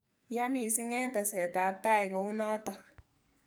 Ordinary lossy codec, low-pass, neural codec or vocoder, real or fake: none; none; codec, 44.1 kHz, 2.6 kbps, SNAC; fake